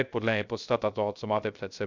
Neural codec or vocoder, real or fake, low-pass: codec, 16 kHz, 0.3 kbps, FocalCodec; fake; 7.2 kHz